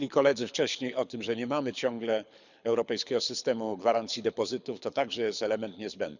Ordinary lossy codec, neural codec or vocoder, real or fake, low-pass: none; codec, 24 kHz, 6 kbps, HILCodec; fake; 7.2 kHz